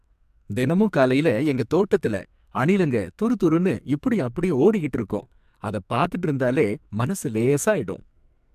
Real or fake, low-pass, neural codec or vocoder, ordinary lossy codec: fake; 14.4 kHz; codec, 44.1 kHz, 2.6 kbps, SNAC; MP3, 96 kbps